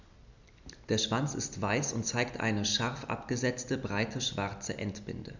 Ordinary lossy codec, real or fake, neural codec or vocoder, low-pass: none; real; none; 7.2 kHz